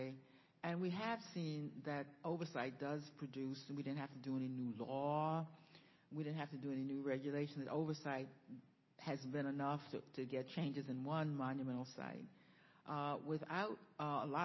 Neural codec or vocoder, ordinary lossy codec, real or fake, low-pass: none; MP3, 24 kbps; real; 7.2 kHz